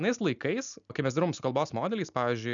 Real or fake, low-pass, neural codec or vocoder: real; 7.2 kHz; none